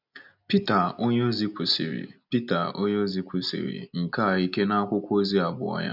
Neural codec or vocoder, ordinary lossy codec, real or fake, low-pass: none; none; real; 5.4 kHz